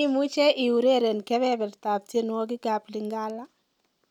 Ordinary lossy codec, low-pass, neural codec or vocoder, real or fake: none; 19.8 kHz; none; real